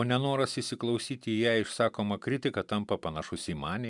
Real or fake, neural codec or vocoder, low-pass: real; none; 10.8 kHz